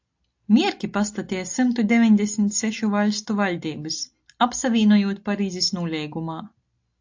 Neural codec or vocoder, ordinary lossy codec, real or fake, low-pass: none; AAC, 48 kbps; real; 7.2 kHz